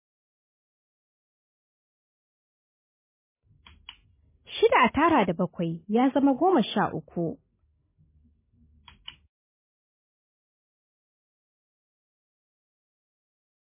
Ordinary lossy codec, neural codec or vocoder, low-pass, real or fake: MP3, 16 kbps; none; 3.6 kHz; real